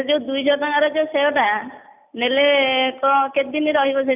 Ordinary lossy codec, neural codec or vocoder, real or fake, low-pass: none; none; real; 3.6 kHz